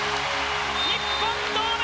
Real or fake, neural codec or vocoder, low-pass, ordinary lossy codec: real; none; none; none